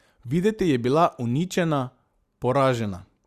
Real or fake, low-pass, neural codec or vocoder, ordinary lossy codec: real; 14.4 kHz; none; Opus, 64 kbps